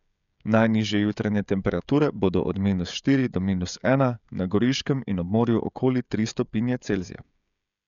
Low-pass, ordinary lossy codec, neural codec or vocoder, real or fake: 7.2 kHz; MP3, 96 kbps; codec, 16 kHz, 16 kbps, FreqCodec, smaller model; fake